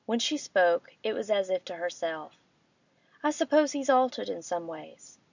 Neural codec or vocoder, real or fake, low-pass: none; real; 7.2 kHz